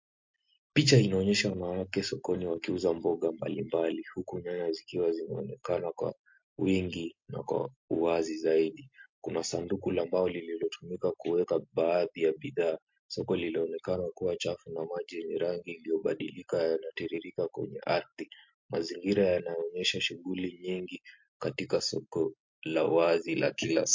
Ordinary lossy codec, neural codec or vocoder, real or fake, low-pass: MP3, 48 kbps; none; real; 7.2 kHz